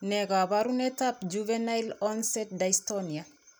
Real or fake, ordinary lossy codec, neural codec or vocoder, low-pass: real; none; none; none